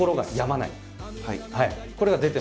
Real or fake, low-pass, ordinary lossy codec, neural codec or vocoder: real; none; none; none